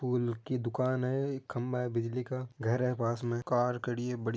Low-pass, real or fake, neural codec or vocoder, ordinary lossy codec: none; real; none; none